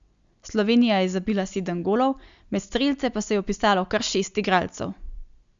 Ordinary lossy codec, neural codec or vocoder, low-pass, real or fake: Opus, 64 kbps; none; 7.2 kHz; real